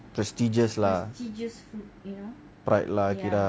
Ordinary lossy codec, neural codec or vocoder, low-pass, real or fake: none; none; none; real